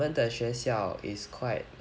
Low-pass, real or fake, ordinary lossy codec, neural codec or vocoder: none; real; none; none